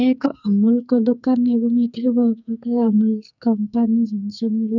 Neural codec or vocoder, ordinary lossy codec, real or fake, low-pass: codec, 44.1 kHz, 2.6 kbps, SNAC; none; fake; 7.2 kHz